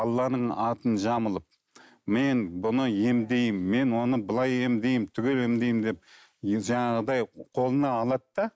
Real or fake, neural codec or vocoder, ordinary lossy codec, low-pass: real; none; none; none